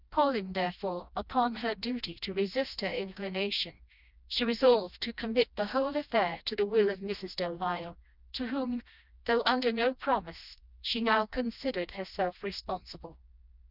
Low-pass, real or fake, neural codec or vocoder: 5.4 kHz; fake; codec, 16 kHz, 1 kbps, FreqCodec, smaller model